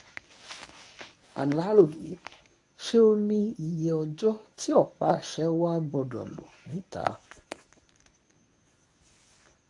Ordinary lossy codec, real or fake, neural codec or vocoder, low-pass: AAC, 64 kbps; fake; codec, 24 kHz, 0.9 kbps, WavTokenizer, medium speech release version 1; 10.8 kHz